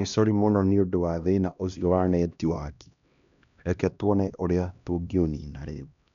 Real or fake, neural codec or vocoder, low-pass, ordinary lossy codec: fake; codec, 16 kHz, 1 kbps, X-Codec, HuBERT features, trained on LibriSpeech; 7.2 kHz; none